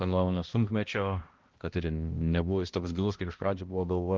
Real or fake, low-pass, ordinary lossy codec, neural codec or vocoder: fake; 7.2 kHz; Opus, 16 kbps; codec, 16 kHz, 1 kbps, X-Codec, HuBERT features, trained on balanced general audio